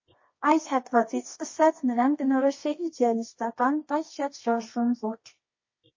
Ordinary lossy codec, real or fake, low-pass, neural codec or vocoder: MP3, 32 kbps; fake; 7.2 kHz; codec, 24 kHz, 0.9 kbps, WavTokenizer, medium music audio release